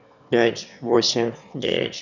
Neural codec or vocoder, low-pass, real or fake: autoencoder, 22.05 kHz, a latent of 192 numbers a frame, VITS, trained on one speaker; 7.2 kHz; fake